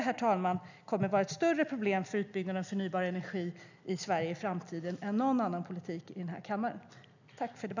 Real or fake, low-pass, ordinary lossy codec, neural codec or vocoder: real; 7.2 kHz; none; none